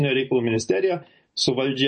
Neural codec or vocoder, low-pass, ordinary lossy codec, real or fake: none; 10.8 kHz; MP3, 32 kbps; real